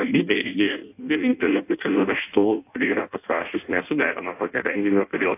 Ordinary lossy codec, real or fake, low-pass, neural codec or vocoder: AAC, 24 kbps; fake; 3.6 kHz; codec, 16 kHz in and 24 kHz out, 0.6 kbps, FireRedTTS-2 codec